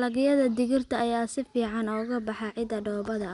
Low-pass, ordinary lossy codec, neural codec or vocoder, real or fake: 10.8 kHz; none; none; real